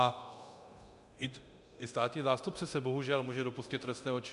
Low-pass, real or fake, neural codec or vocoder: 10.8 kHz; fake; codec, 24 kHz, 0.9 kbps, DualCodec